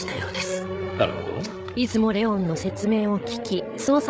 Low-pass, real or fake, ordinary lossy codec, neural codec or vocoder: none; fake; none; codec, 16 kHz, 8 kbps, FreqCodec, larger model